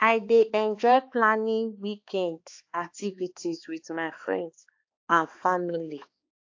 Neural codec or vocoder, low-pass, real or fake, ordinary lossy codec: codec, 16 kHz, 2 kbps, X-Codec, HuBERT features, trained on balanced general audio; 7.2 kHz; fake; AAC, 48 kbps